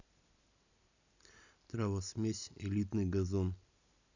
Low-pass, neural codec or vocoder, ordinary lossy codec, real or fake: 7.2 kHz; none; none; real